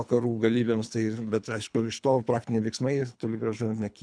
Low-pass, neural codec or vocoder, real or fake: 9.9 kHz; codec, 24 kHz, 3 kbps, HILCodec; fake